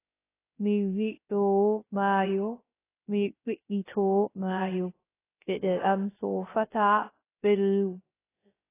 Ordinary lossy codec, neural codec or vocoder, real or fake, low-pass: AAC, 16 kbps; codec, 16 kHz, 0.3 kbps, FocalCodec; fake; 3.6 kHz